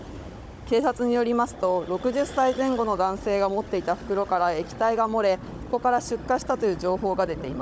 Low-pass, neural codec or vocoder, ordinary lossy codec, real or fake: none; codec, 16 kHz, 16 kbps, FunCodec, trained on Chinese and English, 50 frames a second; none; fake